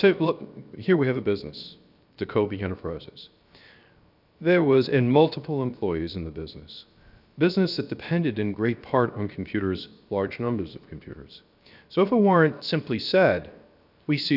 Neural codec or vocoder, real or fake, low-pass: codec, 16 kHz, 0.7 kbps, FocalCodec; fake; 5.4 kHz